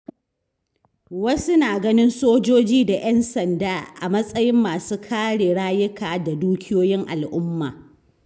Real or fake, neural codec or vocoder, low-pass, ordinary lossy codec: real; none; none; none